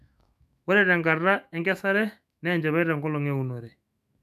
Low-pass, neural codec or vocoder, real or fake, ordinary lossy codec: 14.4 kHz; autoencoder, 48 kHz, 128 numbers a frame, DAC-VAE, trained on Japanese speech; fake; none